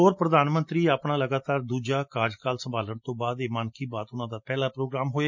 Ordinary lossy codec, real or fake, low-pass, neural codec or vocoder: MP3, 48 kbps; real; 7.2 kHz; none